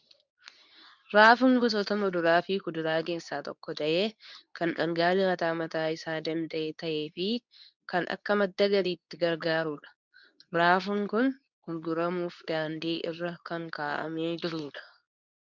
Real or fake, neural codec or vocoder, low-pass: fake; codec, 24 kHz, 0.9 kbps, WavTokenizer, medium speech release version 2; 7.2 kHz